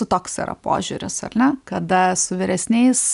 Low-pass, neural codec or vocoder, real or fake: 10.8 kHz; none; real